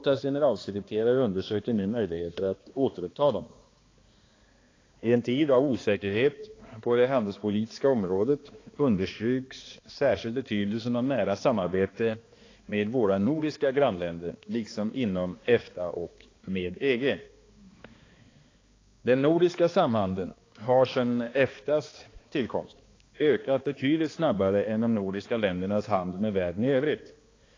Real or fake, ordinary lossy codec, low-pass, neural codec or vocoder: fake; AAC, 32 kbps; 7.2 kHz; codec, 16 kHz, 2 kbps, X-Codec, HuBERT features, trained on balanced general audio